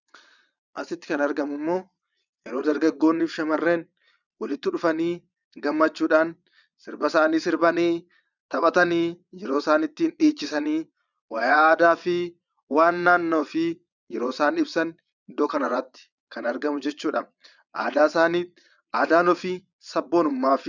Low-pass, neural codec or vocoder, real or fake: 7.2 kHz; vocoder, 22.05 kHz, 80 mel bands, Vocos; fake